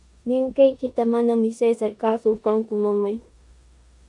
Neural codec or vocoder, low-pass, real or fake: codec, 16 kHz in and 24 kHz out, 0.9 kbps, LongCat-Audio-Codec, four codebook decoder; 10.8 kHz; fake